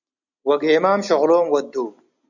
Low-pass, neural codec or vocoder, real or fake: 7.2 kHz; none; real